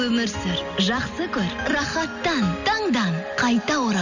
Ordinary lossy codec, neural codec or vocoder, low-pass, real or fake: none; none; 7.2 kHz; real